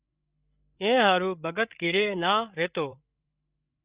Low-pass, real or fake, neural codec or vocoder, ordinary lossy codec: 3.6 kHz; fake; codec, 16 kHz, 8 kbps, FreqCodec, larger model; Opus, 64 kbps